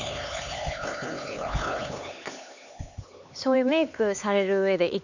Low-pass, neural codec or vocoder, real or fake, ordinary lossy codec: 7.2 kHz; codec, 16 kHz, 4 kbps, X-Codec, HuBERT features, trained on LibriSpeech; fake; none